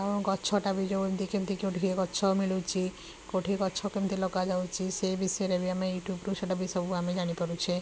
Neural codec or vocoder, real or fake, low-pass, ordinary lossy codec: none; real; none; none